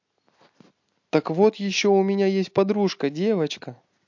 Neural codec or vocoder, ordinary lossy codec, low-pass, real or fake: none; MP3, 48 kbps; 7.2 kHz; real